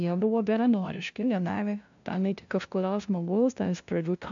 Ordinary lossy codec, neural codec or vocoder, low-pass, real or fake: AAC, 64 kbps; codec, 16 kHz, 0.5 kbps, FunCodec, trained on LibriTTS, 25 frames a second; 7.2 kHz; fake